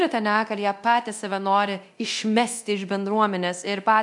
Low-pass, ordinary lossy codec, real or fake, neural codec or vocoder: 10.8 kHz; MP3, 96 kbps; fake; codec, 24 kHz, 0.9 kbps, DualCodec